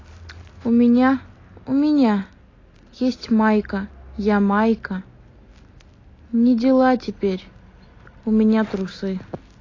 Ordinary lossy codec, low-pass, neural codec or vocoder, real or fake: AAC, 32 kbps; 7.2 kHz; none; real